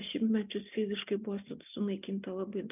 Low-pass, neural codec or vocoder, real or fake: 3.6 kHz; none; real